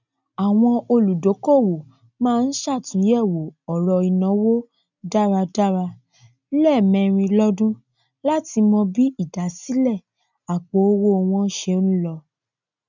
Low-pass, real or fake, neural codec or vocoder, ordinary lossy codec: 7.2 kHz; real; none; none